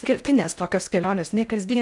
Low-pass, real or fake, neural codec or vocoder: 10.8 kHz; fake; codec, 16 kHz in and 24 kHz out, 0.6 kbps, FocalCodec, streaming, 4096 codes